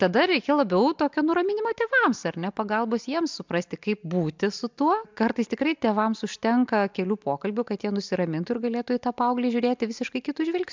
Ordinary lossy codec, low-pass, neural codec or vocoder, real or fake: MP3, 64 kbps; 7.2 kHz; none; real